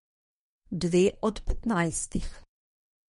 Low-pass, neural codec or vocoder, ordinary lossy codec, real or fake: 10.8 kHz; codec, 24 kHz, 1 kbps, SNAC; MP3, 48 kbps; fake